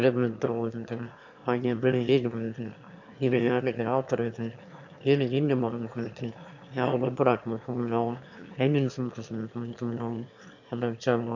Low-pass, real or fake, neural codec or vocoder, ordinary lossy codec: 7.2 kHz; fake; autoencoder, 22.05 kHz, a latent of 192 numbers a frame, VITS, trained on one speaker; none